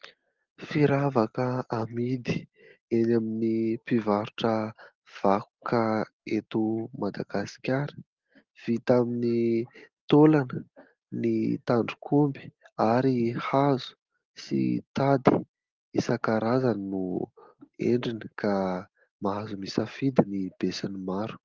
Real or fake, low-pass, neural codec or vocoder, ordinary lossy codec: real; 7.2 kHz; none; Opus, 16 kbps